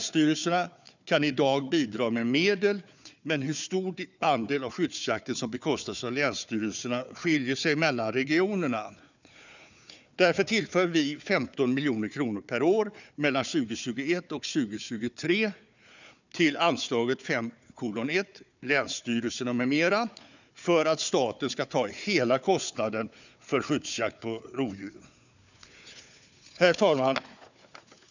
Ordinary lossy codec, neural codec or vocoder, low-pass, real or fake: none; codec, 16 kHz, 4 kbps, FunCodec, trained on Chinese and English, 50 frames a second; 7.2 kHz; fake